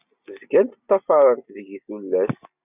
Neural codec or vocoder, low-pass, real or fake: none; 3.6 kHz; real